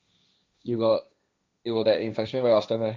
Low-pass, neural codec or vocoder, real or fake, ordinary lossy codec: none; codec, 16 kHz, 1.1 kbps, Voila-Tokenizer; fake; none